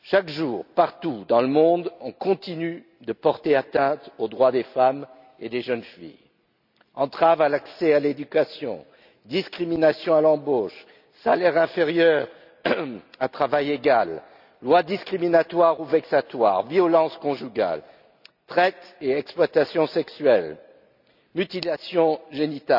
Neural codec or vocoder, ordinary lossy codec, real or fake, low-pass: none; none; real; 5.4 kHz